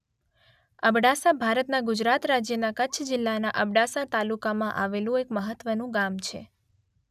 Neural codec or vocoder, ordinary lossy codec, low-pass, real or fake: none; none; 14.4 kHz; real